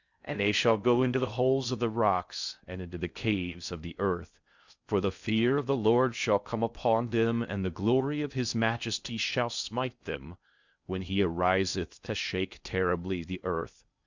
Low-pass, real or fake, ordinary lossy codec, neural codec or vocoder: 7.2 kHz; fake; Opus, 64 kbps; codec, 16 kHz in and 24 kHz out, 0.6 kbps, FocalCodec, streaming, 2048 codes